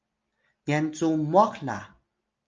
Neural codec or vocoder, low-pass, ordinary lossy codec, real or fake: none; 7.2 kHz; Opus, 24 kbps; real